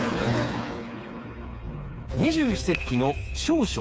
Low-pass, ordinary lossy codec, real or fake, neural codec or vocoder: none; none; fake; codec, 16 kHz, 4 kbps, FreqCodec, smaller model